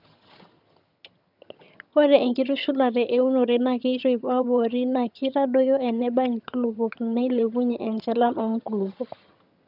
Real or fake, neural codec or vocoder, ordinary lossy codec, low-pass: fake; vocoder, 22.05 kHz, 80 mel bands, HiFi-GAN; none; 5.4 kHz